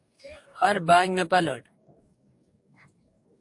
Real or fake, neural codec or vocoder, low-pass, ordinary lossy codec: fake; codec, 44.1 kHz, 2.6 kbps, DAC; 10.8 kHz; AAC, 64 kbps